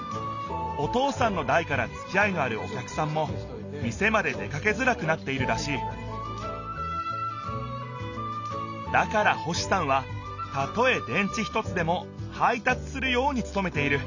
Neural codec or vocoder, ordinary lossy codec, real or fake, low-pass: none; none; real; 7.2 kHz